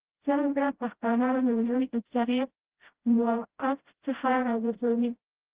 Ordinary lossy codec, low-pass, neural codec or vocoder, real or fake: Opus, 16 kbps; 3.6 kHz; codec, 16 kHz, 0.5 kbps, FreqCodec, smaller model; fake